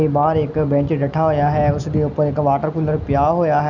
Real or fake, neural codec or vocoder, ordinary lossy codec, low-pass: real; none; none; 7.2 kHz